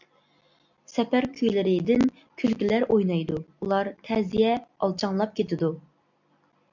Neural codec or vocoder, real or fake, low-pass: none; real; 7.2 kHz